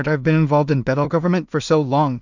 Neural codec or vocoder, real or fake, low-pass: codec, 16 kHz in and 24 kHz out, 0.4 kbps, LongCat-Audio-Codec, two codebook decoder; fake; 7.2 kHz